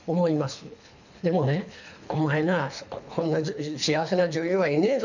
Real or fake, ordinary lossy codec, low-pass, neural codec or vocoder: fake; none; 7.2 kHz; codec, 24 kHz, 3 kbps, HILCodec